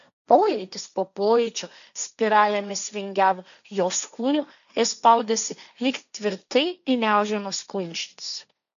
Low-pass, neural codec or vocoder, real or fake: 7.2 kHz; codec, 16 kHz, 1.1 kbps, Voila-Tokenizer; fake